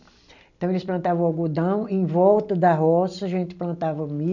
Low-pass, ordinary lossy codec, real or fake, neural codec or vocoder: 7.2 kHz; none; real; none